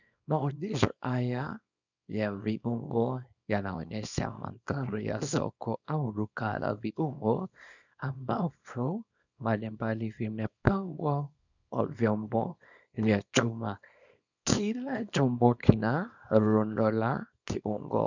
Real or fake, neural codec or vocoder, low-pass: fake; codec, 24 kHz, 0.9 kbps, WavTokenizer, small release; 7.2 kHz